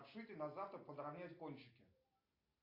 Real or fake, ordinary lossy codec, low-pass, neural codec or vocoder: real; AAC, 32 kbps; 5.4 kHz; none